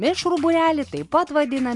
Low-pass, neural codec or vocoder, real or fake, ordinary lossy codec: 10.8 kHz; none; real; MP3, 64 kbps